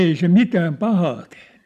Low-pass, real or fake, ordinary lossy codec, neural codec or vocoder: 14.4 kHz; real; none; none